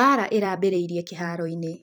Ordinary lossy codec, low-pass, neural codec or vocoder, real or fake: none; none; vocoder, 44.1 kHz, 128 mel bands every 256 samples, BigVGAN v2; fake